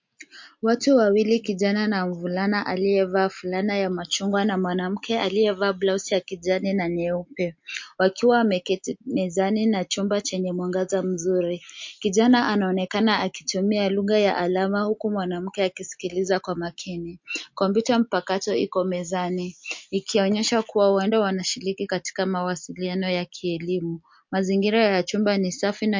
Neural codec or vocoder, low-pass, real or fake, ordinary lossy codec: none; 7.2 kHz; real; MP3, 48 kbps